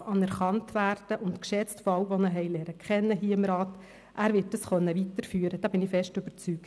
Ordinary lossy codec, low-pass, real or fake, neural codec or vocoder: none; none; real; none